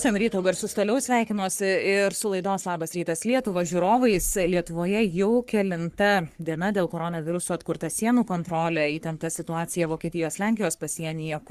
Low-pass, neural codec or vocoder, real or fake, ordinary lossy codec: 14.4 kHz; codec, 44.1 kHz, 3.4 kbps, Pupu-Codec; fake; Opus, 64 kbps